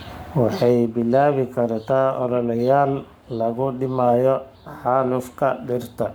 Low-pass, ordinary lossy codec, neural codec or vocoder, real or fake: none; none; codec, 44.1 kHz, 7.8 kbps, Pupu-Codec; fake